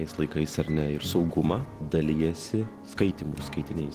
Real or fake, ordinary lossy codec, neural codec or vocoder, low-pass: real; Opus, 16 kbps; none; 14.4 kHz